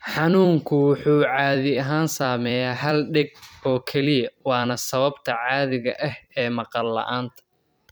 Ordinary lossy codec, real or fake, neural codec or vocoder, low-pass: none; fake; vocoder, 44.1 kHz, 128 mel bands every 256 samples, BigVGAN v2; none